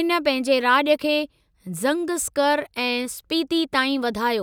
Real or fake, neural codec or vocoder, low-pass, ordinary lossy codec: real; none; none; none